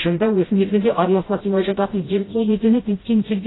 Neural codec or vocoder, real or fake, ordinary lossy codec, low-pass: codec, 16 kHz, 0.5 kbps, FreqCodec, smaller model; fake; AAC, 16 kbps; 7.2 kHz